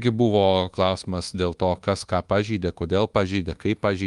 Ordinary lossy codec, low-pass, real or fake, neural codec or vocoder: Opus, 32 kbps; 10.8 kHz; fake; codec, 24 kHz, 1.2 kbps, DualCodec